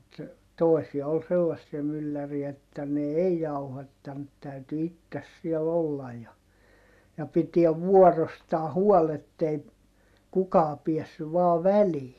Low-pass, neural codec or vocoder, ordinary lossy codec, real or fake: 14.4 kHz; none; MP3, 96 kbps; real